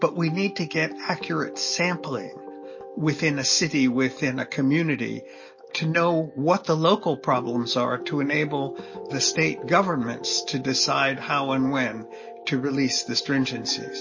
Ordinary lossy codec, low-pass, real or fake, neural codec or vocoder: MP3, 32 kbps; 7.2 kHz; real; none